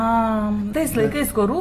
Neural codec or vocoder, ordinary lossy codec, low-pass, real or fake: none; AAC, 48 kbps; 14.4 kHz; real